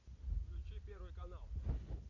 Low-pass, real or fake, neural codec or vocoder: 7.2 kHz; real; none